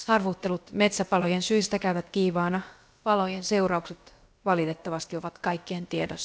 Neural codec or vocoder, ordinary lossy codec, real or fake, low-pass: codec, 16 kHz, about 1 kbps, DyCAST, with the encoder's durations; none; fake; none